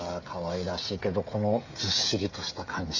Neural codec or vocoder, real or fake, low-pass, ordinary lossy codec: codec, 16 kHz, 16 kbps, FreqCodec, smaller model; fake; 7.2 kHz; AAC, 48 kbps